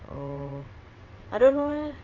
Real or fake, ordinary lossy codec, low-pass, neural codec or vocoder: fake; AAC, 48 kbps; 7.2 kHz; vocoder, 22.05 kHz, 80 mel bands, WaveNeXt